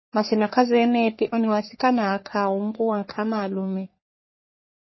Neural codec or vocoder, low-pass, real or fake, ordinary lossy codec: codec, 44.1 kHz, 3.4 kbps, Pupu-Codec; 7.2 kHz; fake; MP3, 24 kbps